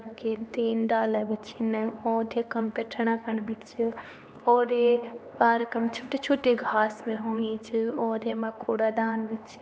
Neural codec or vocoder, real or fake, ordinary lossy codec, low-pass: codec, 16 kHz, 2 kbps, X-Codec, HuBERT features, trained on LibriSpeech; fake; none; none